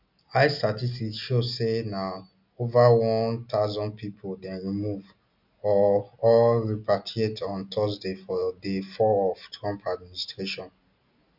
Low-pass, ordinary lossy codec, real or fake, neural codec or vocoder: 5.4 kHz; none; real; none